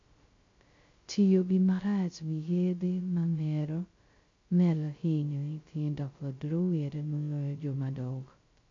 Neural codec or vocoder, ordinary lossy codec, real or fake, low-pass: codec, 16 kHz, 0.2 kbps, FocalCodec; MP3, 48 kbps; fake; 7.2 kHz